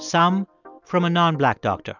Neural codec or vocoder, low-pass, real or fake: none; 7.2 kHz; real